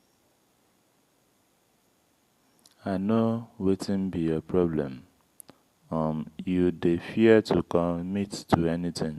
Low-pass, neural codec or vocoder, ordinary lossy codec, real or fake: 14.4 kHz; none; Opus, 64 kbps; real